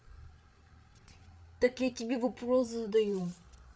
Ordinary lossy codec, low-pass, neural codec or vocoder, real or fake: none; none; codec, 16 kHz, 16 kbps, FreqCodec, larger model; fake